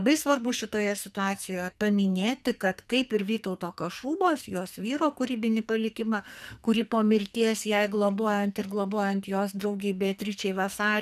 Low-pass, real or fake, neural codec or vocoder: 14.4 kHz; fake; codec, 44.1 kHz, 2.6 kbps, SNAC